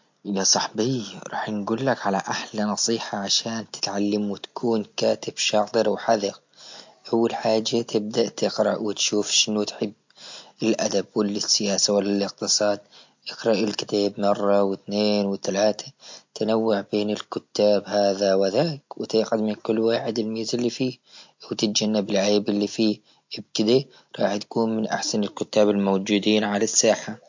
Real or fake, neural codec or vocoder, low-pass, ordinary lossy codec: real; none; 7.2 kHz; MP3, 48 kbps